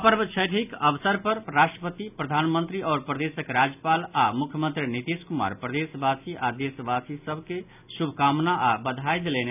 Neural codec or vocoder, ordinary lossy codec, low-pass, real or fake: none; none; 3.6 kHz; real